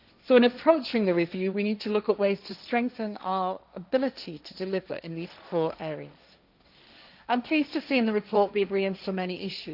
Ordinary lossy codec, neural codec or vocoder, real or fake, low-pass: none; codec, 16 kHz, 1.1 kbps, Voila-Tokenizer; fake; 5.4 kHz